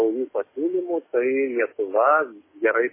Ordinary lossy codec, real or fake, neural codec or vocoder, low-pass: MP3, 16 kbps; fake; codec, 44.1 kHz, 7.8 kbps, DAC; 3.6 kHz